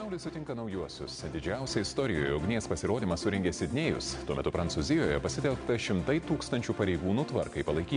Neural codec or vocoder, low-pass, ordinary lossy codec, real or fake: none; 9.9 kHz; MP3, 96 kbps; real